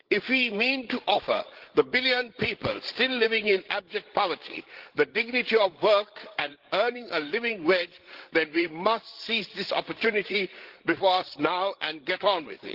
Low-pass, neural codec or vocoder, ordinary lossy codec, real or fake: 5.4 kHz; codec, 16 kHz, 16 kbps, FreqCodec, larger model; Opus, 16 kbps; fake